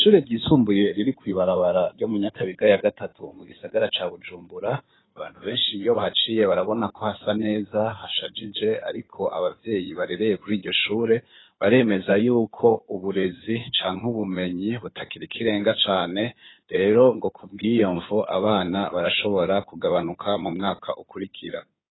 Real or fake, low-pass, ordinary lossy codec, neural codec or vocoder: fake; 7.2 kHz; AAC, 16 kbps; codec, 16 kHz in and 24 kHz out, 2.2 kbps, FireRedTTS-2 codec